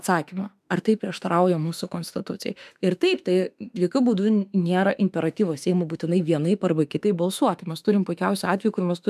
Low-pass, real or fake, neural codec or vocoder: 14.4 kHz; fake; autoencoder, 48 kHz, 32 numbers a frame, DAC-VAE, trained on Japanese speech